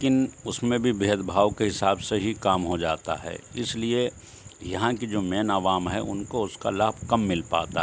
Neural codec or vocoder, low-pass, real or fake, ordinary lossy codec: none; none; real; none